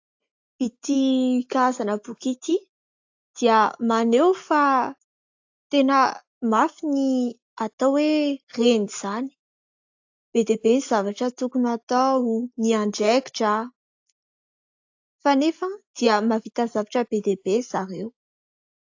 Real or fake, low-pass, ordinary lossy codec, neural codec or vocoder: real; 7.2 kHz; AAC, 48 kbps; none